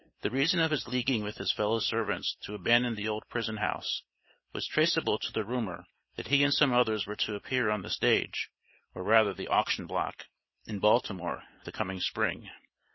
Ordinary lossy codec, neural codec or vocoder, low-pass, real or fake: MP3, 24 kbps; none; 7.2 kHz; real